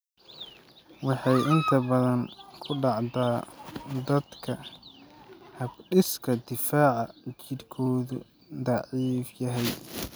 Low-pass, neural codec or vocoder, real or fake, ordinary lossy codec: none; none; real; none